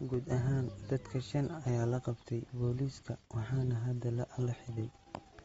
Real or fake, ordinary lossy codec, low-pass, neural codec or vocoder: real; AAC, 24 kbps; 19.8 kHz; none